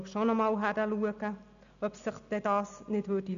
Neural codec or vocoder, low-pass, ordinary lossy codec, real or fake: none; 7.2 kHz; none; real